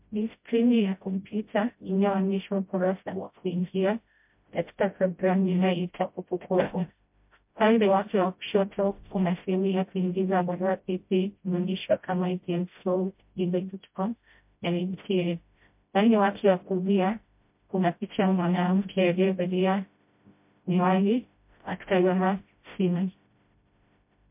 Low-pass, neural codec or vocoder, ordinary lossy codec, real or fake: 3.6 kHz; codec, 16 kHz, 0.5 kbps, FreqCodec, smaller model; MP3, 32 kbps; fake